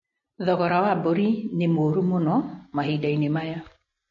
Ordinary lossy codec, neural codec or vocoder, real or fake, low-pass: MP3, 32 kbps; none; real; 7.2 kHz